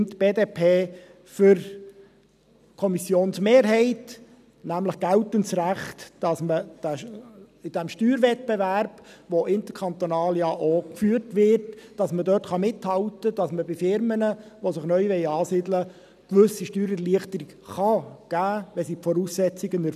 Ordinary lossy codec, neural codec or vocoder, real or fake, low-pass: none; none; real; 14.4 kHz